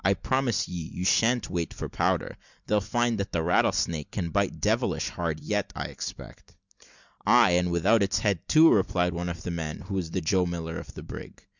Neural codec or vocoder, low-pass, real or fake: none; 7.2 kHz; real